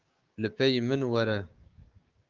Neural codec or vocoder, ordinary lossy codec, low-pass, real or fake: codec, 16 kHz, 6 kbps, DAC; Opus, 32 kbps; 7.2 kHz; fake